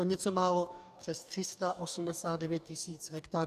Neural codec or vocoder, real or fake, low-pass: codec, 44.1 kHz, 2.6 kbps, DAC; fake; 14.4 kHz